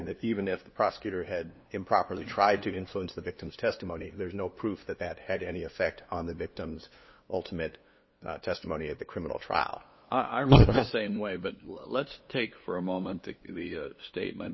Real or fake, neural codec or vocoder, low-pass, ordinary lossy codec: fake; codec, 16 kHz, 2 kbps, FunCodec, trained on LibriTTS, 25 frames a second; 7.2 kHz; MP3, 24 kbps